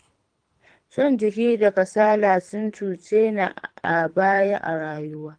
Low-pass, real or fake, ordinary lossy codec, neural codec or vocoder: 9.9 kHz; fake; Opus, 32 kbps; codec, 24 kHz, 3 kbps, HILCodec